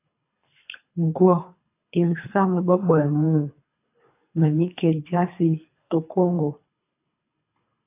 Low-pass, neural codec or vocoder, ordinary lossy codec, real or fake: 3.6 kHz; codec, 24 kHz, 3 kbps, HILCodec; AAC, 24 kbps; fake